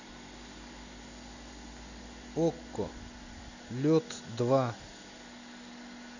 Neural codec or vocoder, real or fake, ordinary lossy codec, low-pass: none; real; none; 7.2 kHz